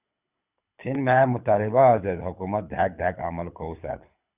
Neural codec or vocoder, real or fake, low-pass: codec, 24 kHz, 6 kbps, HILCodec; fake; 3.6 kHz